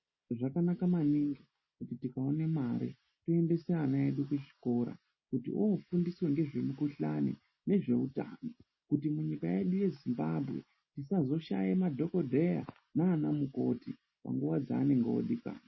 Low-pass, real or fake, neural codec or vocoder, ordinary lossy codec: 7.2 kHz; real; none; MP3, 24 kbps